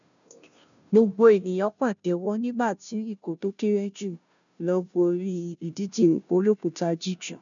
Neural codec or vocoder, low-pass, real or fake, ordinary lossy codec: codec, 16 kHz, 0.5 kbps, FunCodec, trained on Chinese and English, 25 frames a second; 7.2 kHz; fake; none